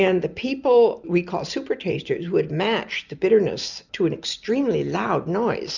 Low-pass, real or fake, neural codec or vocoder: 7.2 kHz; real; none